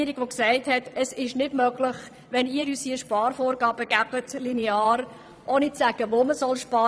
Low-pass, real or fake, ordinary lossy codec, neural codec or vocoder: none; fake; none; vocoder, 22.05 kHz, 80 mel bands, Vocos